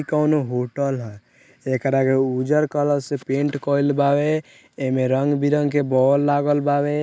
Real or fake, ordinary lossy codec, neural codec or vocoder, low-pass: real; none; none; none